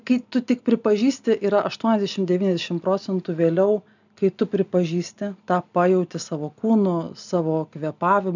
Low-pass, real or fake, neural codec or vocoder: 7.2 kHz; real; none